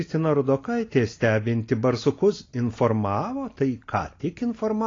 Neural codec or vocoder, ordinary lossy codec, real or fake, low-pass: none; AAC, 32 kbps; real; 7.2 kHz